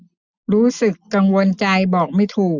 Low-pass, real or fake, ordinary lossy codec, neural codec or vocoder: 7.2 kHz; real; none; none